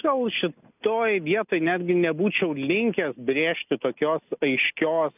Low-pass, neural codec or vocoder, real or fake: 3.6 kHz; none; real